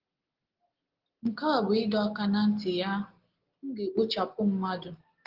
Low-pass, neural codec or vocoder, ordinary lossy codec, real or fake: 5.4 kHz; none; Opus, 16 kbps; real